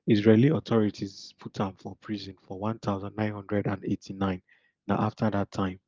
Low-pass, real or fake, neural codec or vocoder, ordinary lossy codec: 7.2 kHz; real; none; Opus, 32 kbps